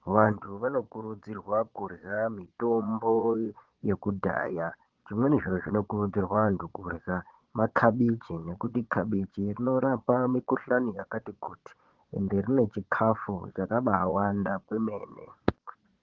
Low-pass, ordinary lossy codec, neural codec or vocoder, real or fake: 7.2 kHz; Opus, 16 kbps; vocoder, 22.05 kHz, 80 mel bands, Vocos; fake